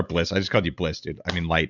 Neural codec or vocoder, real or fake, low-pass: none; real; 7.2 kHz